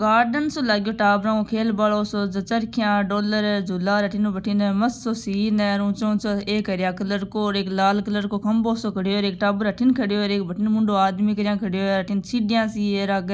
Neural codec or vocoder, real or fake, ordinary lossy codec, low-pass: none; real; none; none